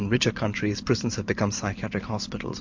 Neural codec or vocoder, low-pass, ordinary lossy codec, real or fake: vocoder, 44.1 kHz, 128 mel bands every 512 samples, BigVGAN v2; 7.2 kHz; MP3, 48 kbps; fake